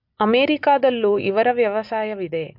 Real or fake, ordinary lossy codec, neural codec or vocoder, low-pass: real; MP3, 48 kbps; none; 5.4 kHz